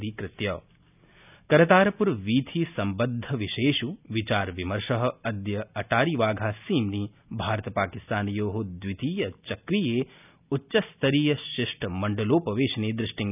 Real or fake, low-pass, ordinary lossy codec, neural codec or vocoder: real; 3.6 kHz; none; none